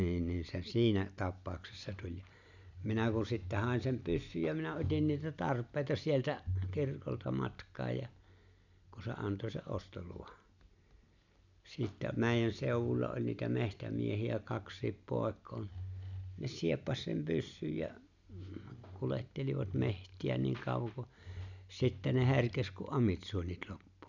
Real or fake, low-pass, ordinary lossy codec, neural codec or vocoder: real; 7.2 kHz; none; none